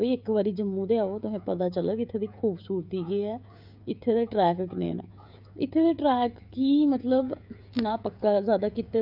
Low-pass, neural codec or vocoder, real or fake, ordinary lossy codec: 5.4 kHz; codec, 16 kHz, 16 kbps, FreqCodec, smaller model; fake; none